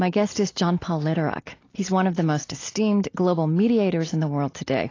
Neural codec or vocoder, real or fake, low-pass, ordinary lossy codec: none; real; 7.2 kHz; AAC, 32 kbps